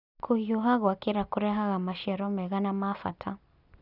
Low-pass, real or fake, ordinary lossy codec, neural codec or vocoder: 5.4 kHz; real; none; none